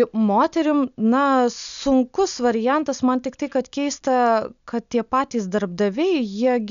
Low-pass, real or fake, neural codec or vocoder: 7.2 kHz; real; none